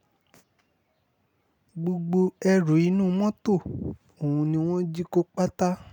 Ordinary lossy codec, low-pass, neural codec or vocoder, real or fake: none; 19.8 kHz; none; real